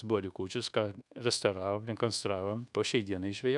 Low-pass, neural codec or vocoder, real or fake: 10.8 kHz; codec, 24 kHz, 1.2 kbps, DualCodec; fake